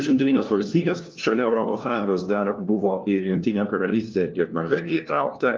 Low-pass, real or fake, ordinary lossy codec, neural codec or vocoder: 7.2 kHz; fake; Opus, 24 kbps; codec, 16 kHz, 1 kbps, FunCodec, trained on LibriTTS, 50 frames a second